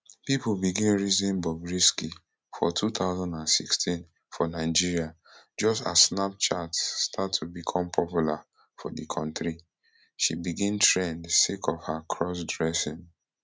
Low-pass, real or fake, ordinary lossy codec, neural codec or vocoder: none; real; none; none